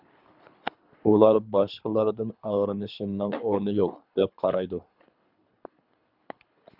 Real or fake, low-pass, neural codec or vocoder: fake; 5.4 kHz; codec, 24 kHz, 3 kbps, HILCodec